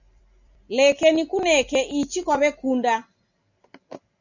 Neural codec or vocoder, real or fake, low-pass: none; real; 7.2 kHz